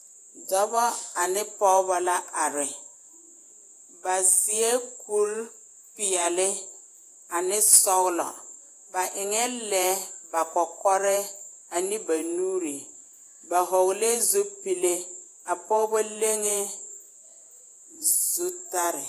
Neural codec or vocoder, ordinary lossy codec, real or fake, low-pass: vocoder, 48 kHz, 128 mel bands, Vocos; AAC, 64 kbps; fake; 14.4 kHz